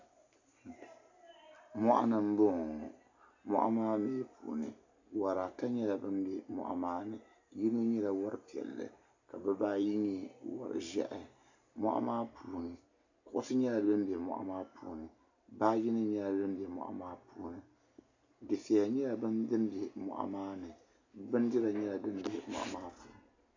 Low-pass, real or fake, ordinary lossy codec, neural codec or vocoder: 7.2 kHz; real; AAC, 48 kbps; none